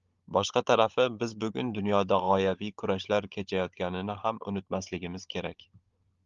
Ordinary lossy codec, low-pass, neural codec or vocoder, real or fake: Opus, 32 kbps; 7.2 kHz; codec, 16 kHz, 16 kbps, FunCodec, trained on Chinese and English, 50 frames a second; fake